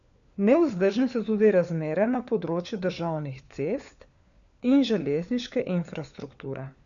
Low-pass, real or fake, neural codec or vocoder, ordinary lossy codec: 7.2 kHz; fake; codec, 16 kHz, 4 kbps, FunCodec, trained on LibriTTS, 50 frames a second; none